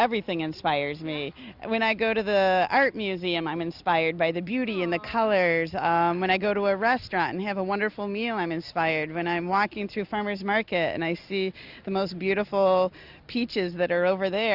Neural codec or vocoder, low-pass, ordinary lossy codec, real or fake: none; 5.4 kHz; Opus, 64 kbps; real